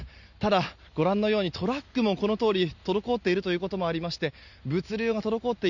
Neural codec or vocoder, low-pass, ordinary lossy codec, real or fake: none; 7.2 kHz; none; real